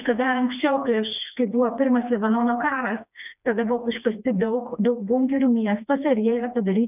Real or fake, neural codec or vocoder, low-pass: fake; codec, 16 kHz, 2 kbps, FreqCodec, smaller model; 3.6 kHz